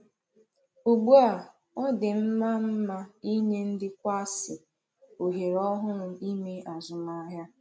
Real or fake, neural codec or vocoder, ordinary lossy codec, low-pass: real; none; none; none